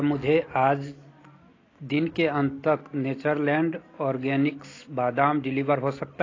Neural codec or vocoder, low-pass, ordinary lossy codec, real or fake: none; 7.2 kHz; AAC, 32 kbps; real